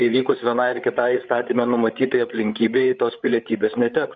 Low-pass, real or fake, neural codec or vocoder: 7.2 kHz; fake; codec, 16 kHz, 8 kbps, FreqCodec, larger model